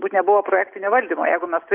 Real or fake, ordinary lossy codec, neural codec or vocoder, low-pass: real; Opus, 24 kbps; none; 3.6 kHz